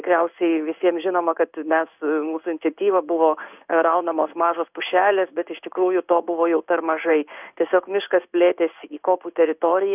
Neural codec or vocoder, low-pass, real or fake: codec, 16 kHz in and 24 kHz out, 1 kbps, XY-Tokenizer; 3.6 kHz; fake